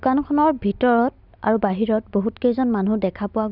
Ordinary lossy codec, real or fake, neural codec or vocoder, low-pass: none; real; none; 5.4 kHz